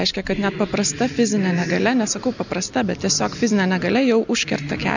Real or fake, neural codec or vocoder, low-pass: real; none; 7.2 kHz